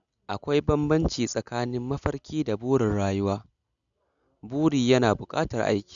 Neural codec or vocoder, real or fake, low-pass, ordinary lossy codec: none; real; 7.2 kHz; none